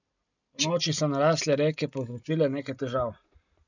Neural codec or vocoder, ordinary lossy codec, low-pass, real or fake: none; none; 7.2 kHz; real